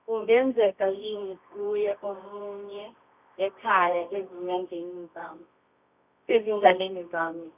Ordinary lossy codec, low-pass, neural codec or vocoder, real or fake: none; 3.6 kHz; codec, 24 kHz, 0.9 kbps, WavTokenizer, medium music audio release; fake